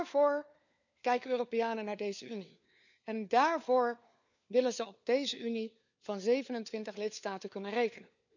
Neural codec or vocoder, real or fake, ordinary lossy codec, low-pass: codec, 16 kHz, 4 kbps, FunCodec, trained on LibriTTS, 50 frames a second; fake; none; 7.2 kHz